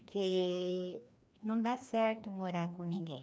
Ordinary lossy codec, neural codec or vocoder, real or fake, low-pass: none; codec, 16 kHz, 1 kbps, FreqCodec, larger model; fake; none